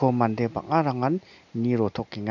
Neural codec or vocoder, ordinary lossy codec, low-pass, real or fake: none; none; 7.2 kHz; real